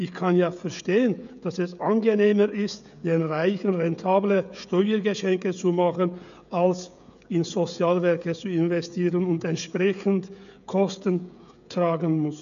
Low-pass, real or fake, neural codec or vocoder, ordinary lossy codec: 7.2 kHz; fake; codec, 16 kHz, 16 kbps, FreqCodec, smaller model; none